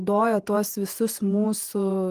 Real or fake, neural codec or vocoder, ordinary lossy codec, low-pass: fake; vocoder, 48 kHz, 128 mel bands, Vocos; Opus, 24 kbps; 14.4 kHz